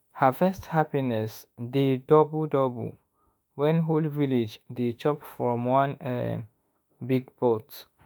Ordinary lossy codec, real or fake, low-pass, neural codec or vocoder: none; fake; none; autoencoder, 48 kHz, 32 numbers a frame, DAC-VAE, trained on Japanese speech